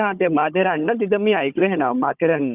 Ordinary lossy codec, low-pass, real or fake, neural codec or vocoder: Opus, 64 kbps; 3.6 kHz; fake; codec, 16 kHz, 16 kbps, FunCodec, trained on Chinese and English, 50 frames a second